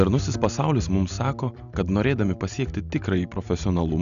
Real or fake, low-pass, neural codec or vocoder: real; 7.2 kHz; none